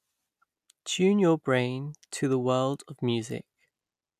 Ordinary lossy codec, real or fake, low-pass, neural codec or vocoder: none; real; 14.4 kHz; none